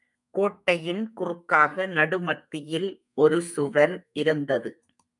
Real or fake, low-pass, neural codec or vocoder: fake; 10.8 kHz; codec, 32 kHz, 1.9 kbps, SNAC